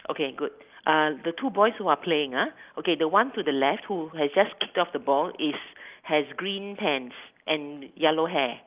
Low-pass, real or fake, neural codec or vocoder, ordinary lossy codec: 3.6 kHz; real; none; Opus, 32 kbps